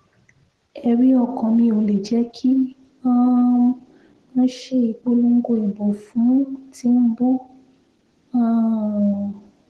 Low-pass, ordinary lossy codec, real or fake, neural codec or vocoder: 10.8 kHz; Opus, 16 kbps; real; none